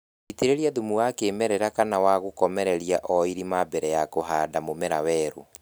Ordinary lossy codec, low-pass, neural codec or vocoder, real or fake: none; none; none; real